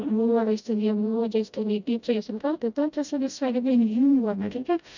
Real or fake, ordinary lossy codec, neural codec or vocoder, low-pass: fake; none; codec, 16 kHz, 0.5 kbps, FreqCodec, smaller model; 7.2 kHz